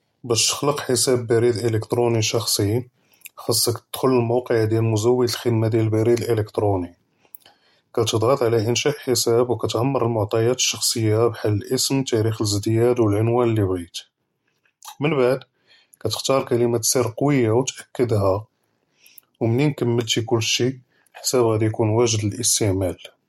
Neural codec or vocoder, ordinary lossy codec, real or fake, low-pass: none; MP3, 64 kbps; real; 19.8 kHz